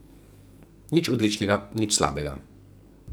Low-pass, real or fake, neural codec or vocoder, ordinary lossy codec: none; fake; codec, 44.1 kHz, 2.6 kbps, SNAC; none